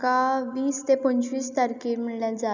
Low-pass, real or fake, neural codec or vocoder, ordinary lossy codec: 7.2 kHz; real; none; none